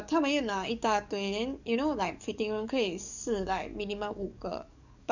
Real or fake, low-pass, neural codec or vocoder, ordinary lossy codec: fake; 7.2 kHz; codec, 44.1 kHz, 7.8 kbps, DAC; none